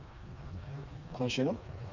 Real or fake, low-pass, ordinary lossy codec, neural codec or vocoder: fake; 7.2 kHz; none; codec, 16 kHz, 2 kbps, FreqCodec, smaller model